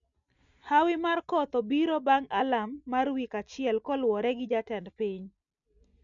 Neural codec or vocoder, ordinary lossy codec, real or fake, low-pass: none; none; real; 7.2 kHz